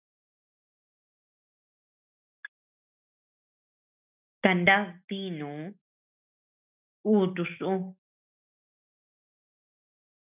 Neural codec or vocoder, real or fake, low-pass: none; real; 3.6 kHz